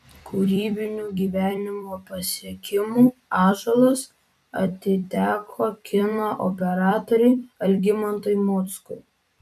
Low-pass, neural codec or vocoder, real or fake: 14.4 kHz; vocoder, 44.1 kHz, 128 mel bands every 256 samples, BigVGAN v2; fake